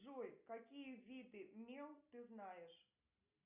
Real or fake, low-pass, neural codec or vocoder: real; 3.6 kHz; none